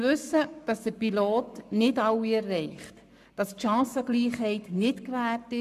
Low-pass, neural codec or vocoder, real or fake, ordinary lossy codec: 14.4 kHz; codec, 44.1 kHz, 7.8 kbps, Pupu-Codec; fake; none